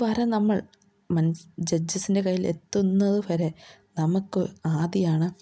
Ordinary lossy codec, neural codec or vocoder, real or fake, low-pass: none; none; real; none